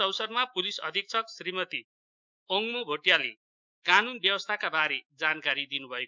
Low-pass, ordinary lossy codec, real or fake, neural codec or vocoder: 7.2 kHz; MP3, 64 kbps; fake; autoencoder, 48 kHz, 128 numbers a frame, DAC-VAE, trained on Japanese speech